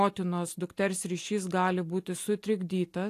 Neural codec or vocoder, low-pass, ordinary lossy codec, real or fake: none; 14.4 kHz; AAC, 64 kbps; real